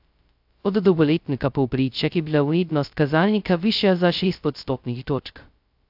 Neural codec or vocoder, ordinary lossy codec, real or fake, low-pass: codec, 16 kHz, 0.2 kbps, FocalCodec; AAC, 48 kbps; fake; 5.4 kHz